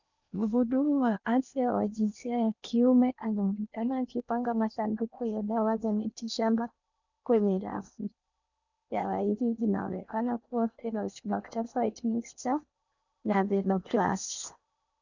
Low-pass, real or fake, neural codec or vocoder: 7.2 kHz; fake; codec, 16 kHz in and 24 kHz out, 0.8 kbps, FocalCodec, streaming, 65536 codes